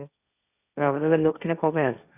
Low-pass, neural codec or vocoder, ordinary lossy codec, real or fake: 3.6 kHz; codec, 16 kHz, 1.1 kbps, Voila-Tokenizer; none; fake